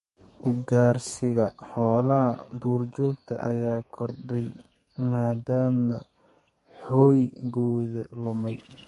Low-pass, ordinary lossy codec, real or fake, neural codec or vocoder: 14.4 kHz; MP3, 48 kbps; fake; codec, 32 kHz, 1.9 kbps, SNAC